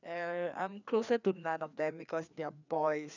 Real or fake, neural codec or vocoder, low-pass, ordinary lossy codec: fake; codec, 16 kHz, 2 kbps, FreqCodec, larger model; 7.2 kHz; none